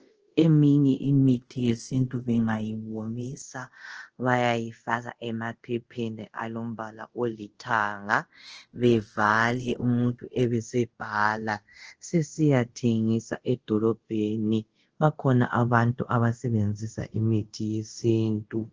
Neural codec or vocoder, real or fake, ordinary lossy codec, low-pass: codec, 24 kHz, 0.5 kbps, DualCodec; fake; Opus, 16 kbps; 7.2 kHz